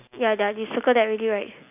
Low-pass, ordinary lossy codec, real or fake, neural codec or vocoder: 3.6 kHz; none; real; none